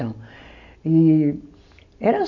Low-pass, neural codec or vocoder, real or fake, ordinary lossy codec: 7.2 kHz; none; real; none